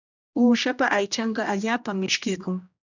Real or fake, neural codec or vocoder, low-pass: fake; codec, 16 kHz, 1 kbps, X-Codec, HuBERT features, trained on general audio; 7.2 kHz